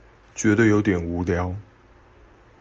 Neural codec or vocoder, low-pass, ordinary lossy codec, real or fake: none; 7.2 kHz; Opus, 24 kbps; real